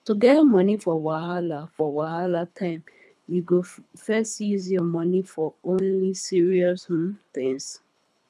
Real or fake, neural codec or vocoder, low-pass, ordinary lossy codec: fake; codec, 24 kHz, 3 kbps, HILCodec; none; none